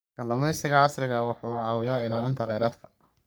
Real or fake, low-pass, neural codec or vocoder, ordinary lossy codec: fake; none; codec, 44.1 kHz, 3.4 kbps, Pupu-Codec; none